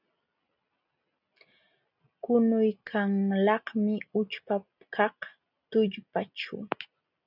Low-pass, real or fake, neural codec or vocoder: 5.4 kHz; real; none